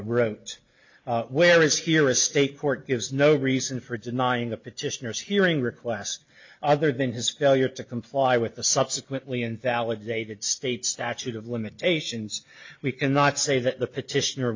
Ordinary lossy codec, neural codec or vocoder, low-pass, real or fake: MP3, 64 kbps; none; 7.2 kHz; real